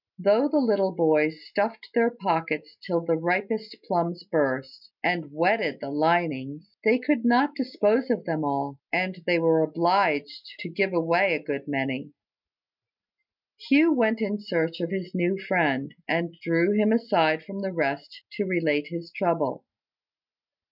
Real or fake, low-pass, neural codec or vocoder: real; 5.4 kHz; none